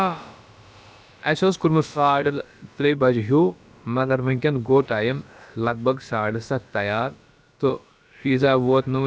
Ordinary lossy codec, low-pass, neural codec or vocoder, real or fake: none; none; codec, 16 kHz, about 1 kbps, DyCAST, with the encoder's durations; fake